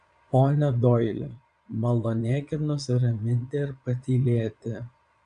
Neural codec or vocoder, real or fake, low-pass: vocoder, 22.05 kHz, 80 mel bands, Vocos; fake; 9.9 kHz